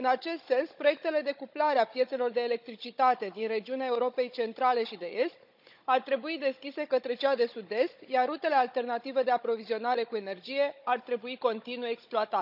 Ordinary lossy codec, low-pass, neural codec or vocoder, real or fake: none; 5.4 kHz; codec, 16 kHz, 16 kbps, FunCodec, trained on Chinese and English, 50 frames a second; fake